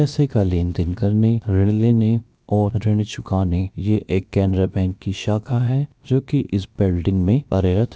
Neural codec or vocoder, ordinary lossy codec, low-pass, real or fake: codec, 16 kHz, about 1 kbps, DyCAST, with the encoder's durations; none; none; fake